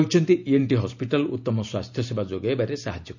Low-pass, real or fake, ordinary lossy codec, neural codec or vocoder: 7.2 kHz; real; none; none